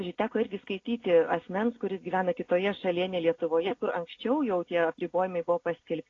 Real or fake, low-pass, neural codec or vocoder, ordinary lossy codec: real; 7.2 kHz; none; AAC, 32 kbps